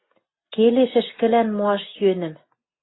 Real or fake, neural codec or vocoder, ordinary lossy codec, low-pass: real; none; AAC, 16 kbps; 7.2 kHz